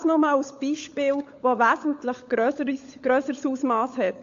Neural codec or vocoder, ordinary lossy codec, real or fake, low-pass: codec, 16 kHz, 16 kbps, FunCodec, trained on LibriTTS, 50 frames a second; AAC, 48 kbps; fake; 7.2 kHz